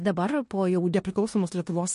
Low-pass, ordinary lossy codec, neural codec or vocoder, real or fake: 10.8 kHz; MP3, 48 kbps; codec, 16 kHz in and 24 kHz out, 0.9 kbps, LongCat-Audio-Codec, four codebook decoder; fake